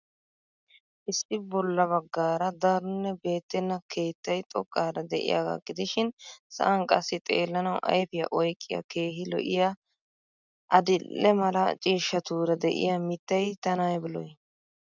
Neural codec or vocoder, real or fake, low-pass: none; real; 7.2 kHz